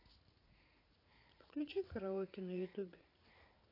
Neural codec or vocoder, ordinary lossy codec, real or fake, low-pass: vocoder, 44.1 kHz, 80 mel bands, Vocos; AAC, 24 kbps; fake; 5.4 kHz